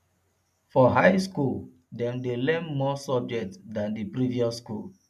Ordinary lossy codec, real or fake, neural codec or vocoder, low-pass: none; real; none; 14.4 kHz